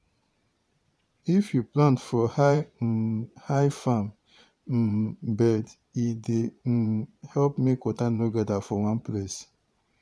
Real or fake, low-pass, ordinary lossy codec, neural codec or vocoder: fake; none; none; vocoder, 22.05 kHz, 80 mel bands, Vocos